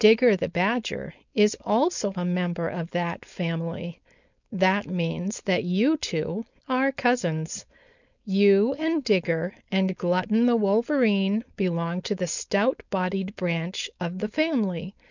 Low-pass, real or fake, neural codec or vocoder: 7.2 kHz; fake; codec, 16 kHz, 4.8 kbps, FACodec